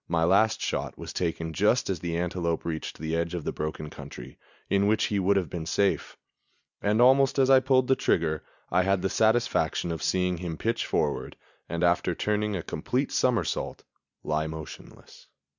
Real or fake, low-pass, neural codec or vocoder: real; 7.2 kHz; none